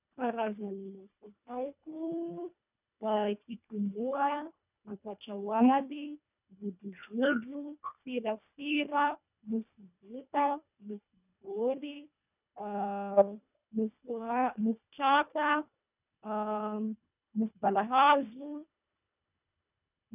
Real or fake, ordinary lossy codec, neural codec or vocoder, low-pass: fake; none; codec, 24 kHz, 1.5 kbps, HILCodec; 3.6 kHz